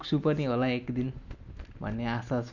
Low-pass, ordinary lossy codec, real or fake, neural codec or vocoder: 7.2 kHz; none; real; none